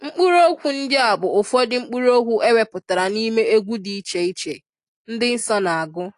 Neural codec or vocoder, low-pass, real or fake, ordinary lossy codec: none; 10.8 kHz; real; AAC, 48 kbps